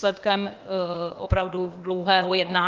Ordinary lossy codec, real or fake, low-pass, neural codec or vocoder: Opus, 32 kbps; fake; 7.2 kHz; codec, 16 kHz, 0.8 kbps, ZipCodec